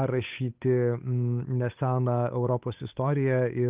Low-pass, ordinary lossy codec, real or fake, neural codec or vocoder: 3.6 kHz; Opus, 24 kbps; fake; codec, 16 kHz, 8 kbps, FunCodec, trained on Chinese and English, 25 frames a second